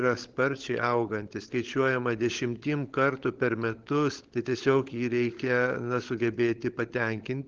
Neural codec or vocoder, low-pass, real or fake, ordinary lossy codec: codec, 16 kHz, 16 kbps, FunCodec, trained on LibriTTS, 50 frames a second; 7.2 kHz; fake; Opus, 16 kbps